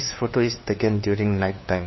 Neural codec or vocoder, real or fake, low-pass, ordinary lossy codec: codec, 16 kHz, 2 kbps, FunCodec, trained on LibriTTS, 25 frames a second; fake; 7.2 kHz; MP3, 24 kbps